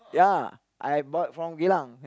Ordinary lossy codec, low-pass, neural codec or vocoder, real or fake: none; none; none; real